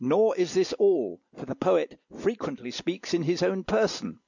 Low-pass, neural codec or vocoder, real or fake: 7.2 kHz; none; real